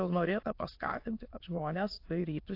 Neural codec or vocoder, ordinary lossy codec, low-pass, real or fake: autoencoder, 22.05 kHz, a latent of 192 numbers a frame, VITS, trained on many speakers; AAC, 32 kbps; 5.4 kHz; fake